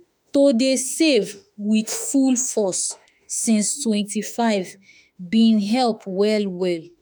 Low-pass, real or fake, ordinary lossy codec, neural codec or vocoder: none; fake; none; autoencoder, 48 kHz, 32 numbers a frame, DAC-VAE, trained on Japanese speech